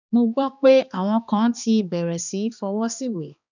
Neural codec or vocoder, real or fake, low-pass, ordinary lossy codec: codec, 16 kHz, 2 kbps, X-Codec, HuBERT features, trained on balanced general audio; fake; 7.2 kHz; none